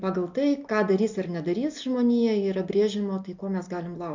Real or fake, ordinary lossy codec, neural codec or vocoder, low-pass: real; MP3, 64 kbps; none; 7.2 kHz